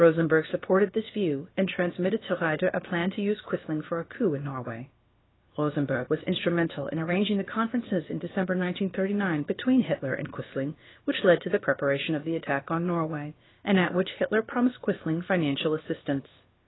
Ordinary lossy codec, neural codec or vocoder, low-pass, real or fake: AAC, 16 kbps; codec, 16 kHz, about 1 kbps, DyCAST, with the encoder's durations; 7.2 kHz; fake